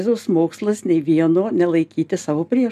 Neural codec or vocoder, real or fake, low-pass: none; real; 14.4 kHz